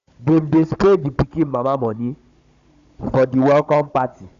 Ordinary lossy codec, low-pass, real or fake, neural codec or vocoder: Opus, 64 kbps; 7.2 kHz; fake; codec, 16 kHz, 16 kbps, FunCodec, trained on Chinese and English, 50 frames a second